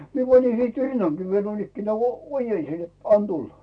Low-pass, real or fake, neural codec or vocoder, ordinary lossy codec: 9.9 kHz; real; none; none